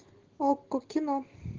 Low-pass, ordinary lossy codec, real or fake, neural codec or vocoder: 7.2 kHz; Opus, 16 kbps; real; none